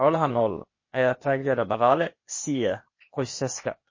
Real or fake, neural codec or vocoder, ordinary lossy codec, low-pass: fake; codec, 16 kHz, 0.8 kbps, ZipCodec; MP3, 32 kbps; 7.2 kHz